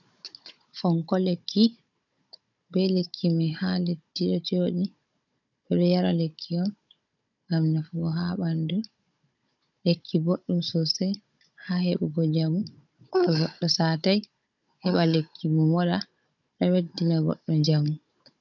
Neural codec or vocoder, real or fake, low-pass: codec, 16 kHz, 16 kbps, FunCodec, trained on Chinese and English, 50 frames a second; fake; 7.2 kHz